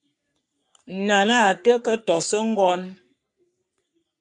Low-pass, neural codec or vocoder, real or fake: 10.8 kHz; codec, 44.1 kHz, 3.4 kbps, Pupu-Codec; fake